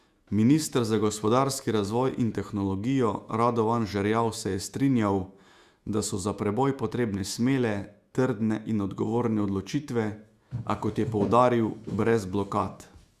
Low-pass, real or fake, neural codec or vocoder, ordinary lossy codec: 14.4 kHz; fake; autoencoder, 48 kHz, 128 numbers a frame, DAC-VAE, trained on Japanese speech; Opus, 64 kbps